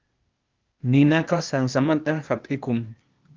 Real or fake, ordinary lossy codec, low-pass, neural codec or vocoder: fake; Opus, 24 kbps; 7.2 kHz; codec, 16 kHz, 0.8 kbps, ZipCodec